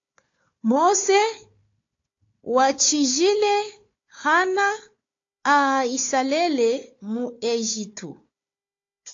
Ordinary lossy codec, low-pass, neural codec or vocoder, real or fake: AAC, 48 kbps; 7.2 kHz; codec, 16 kHz, 4 kbps, FunCodec, trained on Chinese and English, 50 frames a second; fake